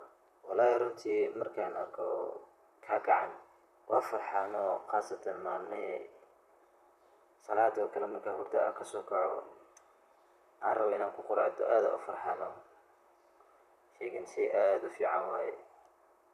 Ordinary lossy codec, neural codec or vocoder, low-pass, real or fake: none; vocoder, 44.1 kHz, 128 mel bands, Pupu-Vocoder; 14.4 kHz; fake